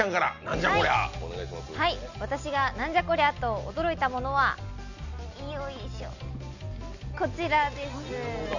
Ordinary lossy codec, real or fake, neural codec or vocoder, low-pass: none; real; none; 7.2 kHz